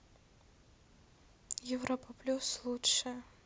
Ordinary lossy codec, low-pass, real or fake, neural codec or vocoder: none; none; real; none